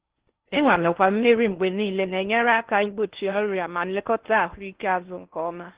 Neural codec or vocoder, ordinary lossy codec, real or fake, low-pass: codec, 16 kHz in and 24 kHz out, 0.6 kbps, FocalCodec, streaming, 4096 codes; Opus, 16 kbps; fake; 3.6 kHz